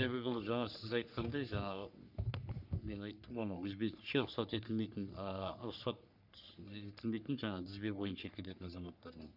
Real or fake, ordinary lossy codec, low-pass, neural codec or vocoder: fake; none; 5.4 kHz; codec, 44.1 kHz, 3.4 kbps, Pupu-Codec